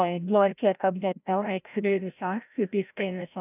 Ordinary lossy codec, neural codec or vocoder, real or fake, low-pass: none; codec, 16 kHz, 0.5 kbps, FreqCodec, larger model; fake; 3.6 kHz